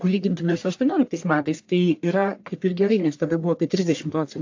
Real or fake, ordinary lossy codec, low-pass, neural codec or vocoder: fake; AAC, 48 kbps; 7.2 kHz; codec, 44.1 kHz, 1.7 kbps, Pupu-Codec